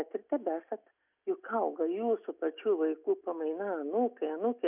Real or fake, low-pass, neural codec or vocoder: real; 3.6 kHz; none